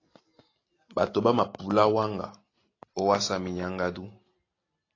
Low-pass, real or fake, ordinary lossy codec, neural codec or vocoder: 7.2 kHz; real; AAC, 32 kbps; none